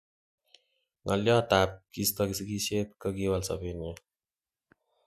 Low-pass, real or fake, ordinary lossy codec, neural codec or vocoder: 14.4 kHz; real; none; none